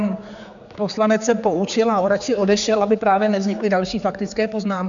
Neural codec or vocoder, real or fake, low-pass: codec, 16 kHz, 4 kbps, X-Codec, HuBERT features, trained on general audio; fake; 7.2 kHz